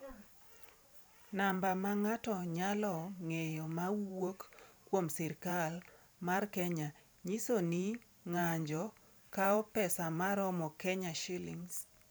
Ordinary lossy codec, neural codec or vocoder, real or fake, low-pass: none; vocoder, 44.1 kHz, 128 mel bands every 512 samples, BigVGAN v2; fake; none